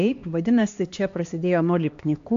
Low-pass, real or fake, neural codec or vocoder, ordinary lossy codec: 7.2 kHz; fake; codec, 16 kHz, 2 kbps, X-Codec, HuBERT features, trained on LibriSpeech; AAC, 48 kbps